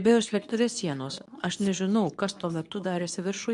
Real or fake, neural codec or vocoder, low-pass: fake; codec, 24 kHz, 0.9 kbps, WavTokenizer, medium speech release version 2; 10.8 kHz